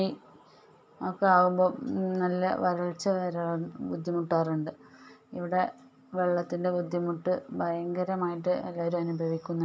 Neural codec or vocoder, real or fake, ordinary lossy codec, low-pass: none; real; none; none